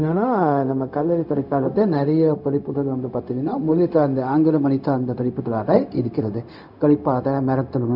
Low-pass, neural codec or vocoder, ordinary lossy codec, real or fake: 5.4 kHz; codec, 16 kHz, 0.4 kbps, LongCat-Audio-Codec; none; fake